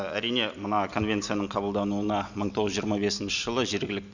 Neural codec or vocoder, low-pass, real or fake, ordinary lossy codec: none; 7.2 kHz; real; none